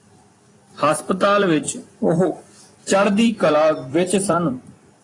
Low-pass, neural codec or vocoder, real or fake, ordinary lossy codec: 10.8 kHz; vocoder, 44.1 kHz, 128 mel bands every 256 samples, BigVGAN v2; fake; AAC, 32 kbps